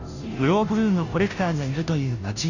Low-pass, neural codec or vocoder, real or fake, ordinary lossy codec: 7.2 kHz; codec, 16 kHz, 0.5 kbps, FunCodec, trained on Chinese and English, 25 frames a second; fake; none